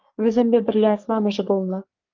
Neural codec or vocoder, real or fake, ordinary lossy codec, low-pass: codec, 44.1 kHz, 3.4 kbps, Pupu-Codec; fake; Opus, 24 kbps; 7.2 kHz